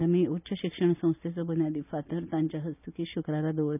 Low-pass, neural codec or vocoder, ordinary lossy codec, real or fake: 3.6 kHz; none; none; real